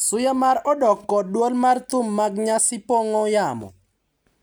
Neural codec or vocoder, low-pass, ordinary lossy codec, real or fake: none; none; none; real